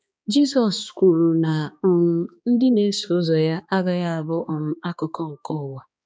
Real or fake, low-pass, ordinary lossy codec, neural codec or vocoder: fake; none; none; codec, 16 kHz, 2 kbps, X-Codec, HuBERT features, trained on balanced general audio